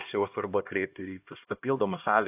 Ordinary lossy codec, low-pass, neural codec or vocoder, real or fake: AAC, 32 kbps; 3.6 kHz; codec, 16 kHz, 1 kbps, X-Codec, HuBERT features, trained on LibriSpeech; fake